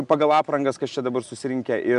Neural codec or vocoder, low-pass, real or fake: none; 10.8 kHz; real